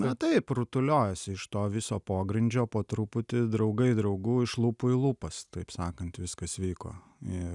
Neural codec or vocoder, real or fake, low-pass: none; real; 10.8 kHz